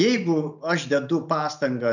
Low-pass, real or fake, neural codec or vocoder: 7.2 kHz; real; none